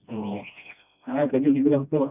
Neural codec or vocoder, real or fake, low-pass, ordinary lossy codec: codec, 16 kHz, 1 kbps, FreqCodec, smaller model; fake; 3.6 kHz; none